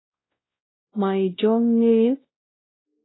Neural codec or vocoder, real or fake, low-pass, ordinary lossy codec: codec, 16 kHz, 0.5 kbps, X-Codec, WavLM features, trained on Multilingual LibriSpeech; fake; 7.2 kHz; AAC, 16 kbps